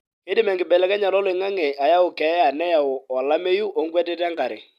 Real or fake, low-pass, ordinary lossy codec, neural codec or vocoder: real; 14.4 kHz; none; none